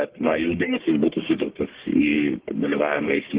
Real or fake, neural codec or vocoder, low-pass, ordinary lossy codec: fake; codec, 44.1 kHz, 1.7 kbps, Pupu-Codec; 3.6 kHz; Opus, 16 kbps